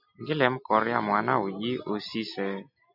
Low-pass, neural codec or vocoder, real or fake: 5.4 kHz; none; real